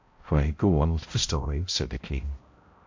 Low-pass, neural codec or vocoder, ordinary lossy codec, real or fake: 7.2 kHz; codec, 16 kHz, 0.5 kbps, X-Codec, HuBERT features, trained on balanced general audio; MP3, 48 kbps; fake